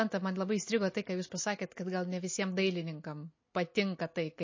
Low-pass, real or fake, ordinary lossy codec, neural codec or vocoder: 7.2 kHz; real; MP3, 32 kbps; none